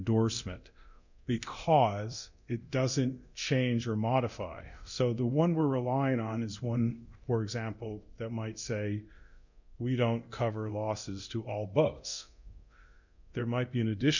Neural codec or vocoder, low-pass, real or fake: codec, 24 kHz, 0.9 kbps, DualCodec; 7.2 kHz; fake